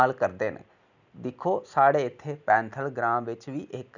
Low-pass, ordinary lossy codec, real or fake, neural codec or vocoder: 7.2 kHz; none; real; none